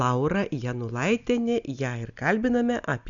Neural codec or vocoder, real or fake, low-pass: none; real; 7.2 kHz